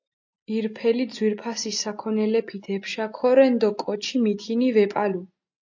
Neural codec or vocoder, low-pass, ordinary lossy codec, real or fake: none; 7.2 kHz; AAC, 48 kbps; real